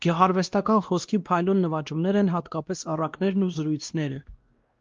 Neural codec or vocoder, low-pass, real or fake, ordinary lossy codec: codec, 16 kHz, 1 kbps, X-Codec, WavLM features, trained on Multilingual LibriSpeech; 7.2 kHz; fake; Opus, 32 kbps